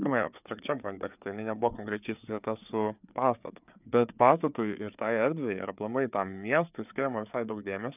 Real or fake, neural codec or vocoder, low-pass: fake; codec, 16 kHz, 4 kbps, FreqCodec, larger model; 3.6 kHz